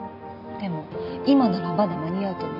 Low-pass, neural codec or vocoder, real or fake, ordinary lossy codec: 5.4 kHz; none; real; none